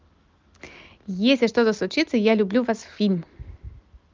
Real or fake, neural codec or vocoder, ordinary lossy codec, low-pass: real; none; Opus, 24 kbps; 7.2 kHz